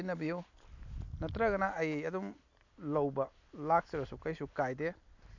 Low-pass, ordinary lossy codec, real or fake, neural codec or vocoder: 7.2 kHz; AAC, 48 kbps; real; none